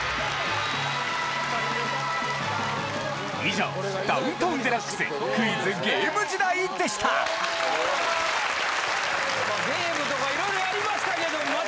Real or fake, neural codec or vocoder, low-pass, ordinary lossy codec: real; none; none; none